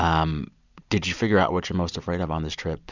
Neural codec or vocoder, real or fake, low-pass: vocoder, 44.1 kHz, 128 mel bands every 256 samples, BigVGAN v2; fake; 7.2 kHz